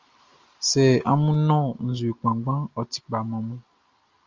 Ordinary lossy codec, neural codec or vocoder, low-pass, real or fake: Opus, 32 kbps; none; 7.2 kHz; real